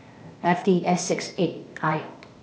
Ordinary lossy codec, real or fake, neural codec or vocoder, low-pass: none; fake; codec, 16 kHz, 0.8 kbps, ZipCodec; none